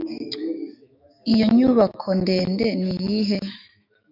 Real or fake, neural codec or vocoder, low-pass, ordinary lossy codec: fake; autoencoder, 48 kHz, 128 numbers a frame, DAC-VAE, trained on Japanese speech; 5.4 kHz; AAC, 48 kbps